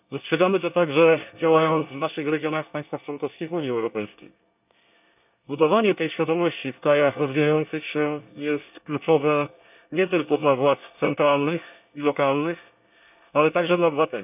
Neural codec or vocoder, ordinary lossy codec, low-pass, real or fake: codec, 24 kHz, 1 kbps, SNAC; none; 3.6 kHz; fake